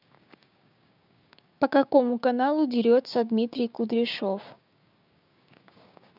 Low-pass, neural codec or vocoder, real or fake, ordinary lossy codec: 5.4 kHz; codec, 16 kHz, 6 kbps, DAC; fake; none